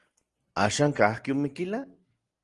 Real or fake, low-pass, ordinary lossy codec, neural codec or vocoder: real; 10.8 kHz; Opus, 24 kbps; none